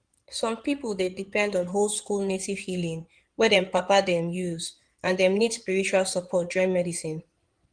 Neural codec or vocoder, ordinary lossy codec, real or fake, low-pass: codec, 16 kHz in and 24 kHz out, 2.2 kbps, FireRedTTS-2 codec; Opus, 24 kbps; fake; 9.9 kHz